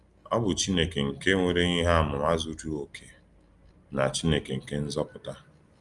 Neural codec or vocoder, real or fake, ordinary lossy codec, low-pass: none; real; Opus, 32 kbps; 10.8 kHz